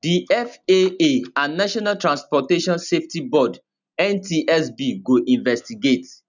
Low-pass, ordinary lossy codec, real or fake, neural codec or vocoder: 7.2 kHz; none; real; none